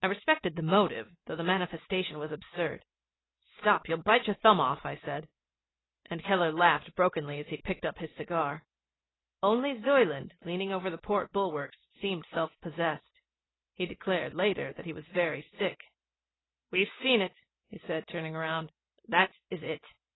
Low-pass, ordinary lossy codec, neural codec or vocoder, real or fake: 7.2 kHz; AAC, 16 kbps; none; real